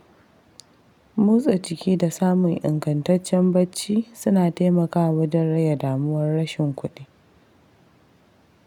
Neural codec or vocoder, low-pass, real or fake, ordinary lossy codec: none; 19.8 kHz; real; none